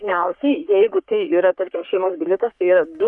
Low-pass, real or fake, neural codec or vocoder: 10.8 kHz; fake; codec, 44.1 kHz, 3.4 kbps, Pupu-Codec